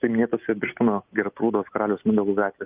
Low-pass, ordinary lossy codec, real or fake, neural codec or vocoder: 3.6 kHz; Opus, 32 kbps; real; none